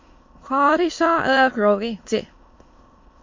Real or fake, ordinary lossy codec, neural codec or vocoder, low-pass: fake; MP3, 48 kbps; autoencoder, 22.05 kHz, a latent of 192 numbers a frame, VITS, trained on many speakers; 7.2 kHz